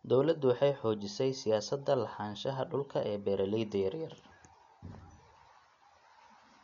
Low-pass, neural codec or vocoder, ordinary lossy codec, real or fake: 7.2 kHz; none; none; real